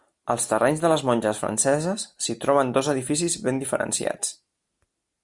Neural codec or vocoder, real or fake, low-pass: none; real; 10.8 kHz